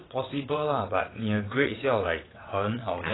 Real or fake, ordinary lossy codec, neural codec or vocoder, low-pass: fake; AAC, 16 kbps; vocoder, 22.05 kHz, 80 mel bands, WaveNeXt; 7.2 kHz